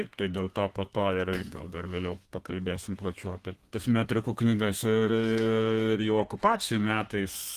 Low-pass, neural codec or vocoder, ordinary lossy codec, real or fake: 14.4 kHz; codec, 32 kHz, 1.9 kbps, SNAC; Opus, 24 kbps; fake